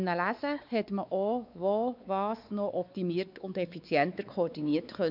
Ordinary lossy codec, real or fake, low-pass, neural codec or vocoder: none; fake; 5.4 kHz; codec, 16 kHz, 4 kbps, X-Codec, WavLM features, trained on Multilingual LibriSpeech